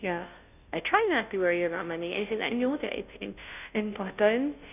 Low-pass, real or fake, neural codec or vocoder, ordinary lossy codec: 3.6 kHz; fake; codec, 16 kHz, 0.5 kbps, FunCodec, trained on Chinese and English, 25 frames a second; none